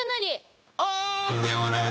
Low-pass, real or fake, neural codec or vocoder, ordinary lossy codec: none; fake; codec, 16 kHz, 0.9 kbps, LongCat-Audio-Codec; none